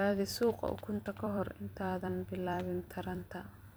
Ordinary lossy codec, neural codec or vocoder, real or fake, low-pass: none; vocoder, 44.1 kHz, 128 mel bands every 256 samples, BigVGAN v2; fake; none